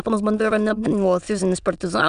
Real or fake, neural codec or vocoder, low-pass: fake; autoencoder, 22.05 kHz, a latent of 192 numbers a frame, VITS, trained on many speakers; 9.9 kHz